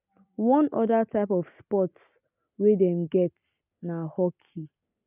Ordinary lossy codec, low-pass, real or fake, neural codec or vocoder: none; 3.6 kHz; real; none